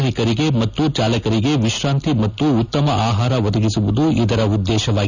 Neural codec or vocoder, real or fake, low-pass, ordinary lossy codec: none; real; 7.2 kHz; none